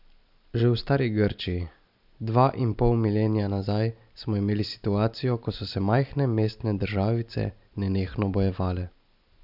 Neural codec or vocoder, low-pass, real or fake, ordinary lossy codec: none; 5.4 kHz; real; none